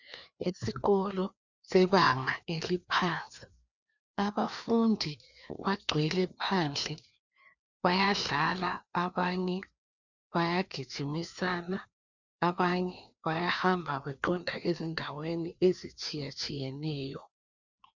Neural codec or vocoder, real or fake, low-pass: codec, 16 kHz, 2 kbps, FreqCodec, larger model; fake; 7.2 kHz